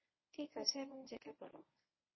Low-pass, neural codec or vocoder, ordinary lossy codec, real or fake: 7.2 kHz; codec, 24 kHz, 0.9 kbps, WavTokenizer, medium speech release version 1; MP3, 24 kbps; fake